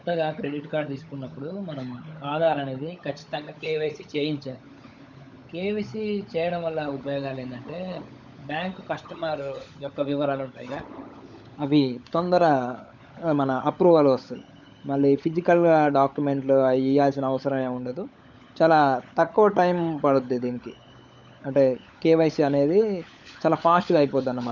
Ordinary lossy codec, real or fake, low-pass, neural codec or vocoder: none; fake; 7.2 kHz; codec, 16 kHz, 16 kbps, FunCodec, trained on LibriTTS, 50 frames a second